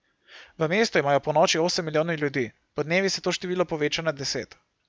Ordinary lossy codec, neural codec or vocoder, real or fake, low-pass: none; none; real; none